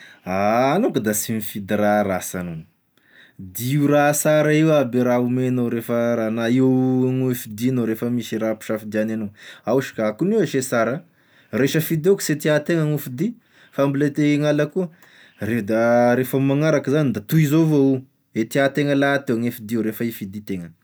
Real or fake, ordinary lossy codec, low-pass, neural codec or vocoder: real; none; none; none